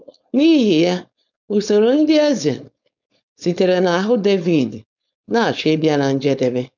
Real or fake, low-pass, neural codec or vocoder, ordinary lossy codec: fake; 7.2 kHz; codec, 16 kHz, 4.8 kbps, FACodec; none